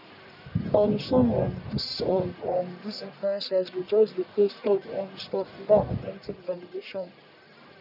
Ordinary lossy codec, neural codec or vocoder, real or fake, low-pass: none; codec, 44.1 kHz, 1.7 kbps, Pupu-Codec; fake; 5.4 kHz